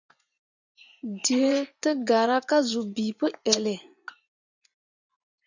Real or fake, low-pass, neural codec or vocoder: fake; 7.2 kHz; vocoder, 24 kHz, 100 mel bands, Vocos